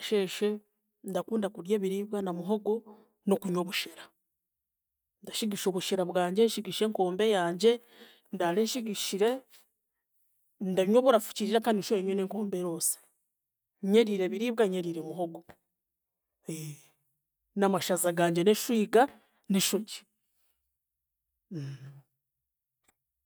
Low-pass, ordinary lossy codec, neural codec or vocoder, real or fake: none; none; none; real